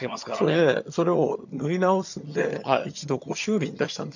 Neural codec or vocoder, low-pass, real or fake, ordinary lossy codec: vocoder, 22.05 kHz, 80 mel bands, HiFi-GAN; 7.2 kHz; fake; none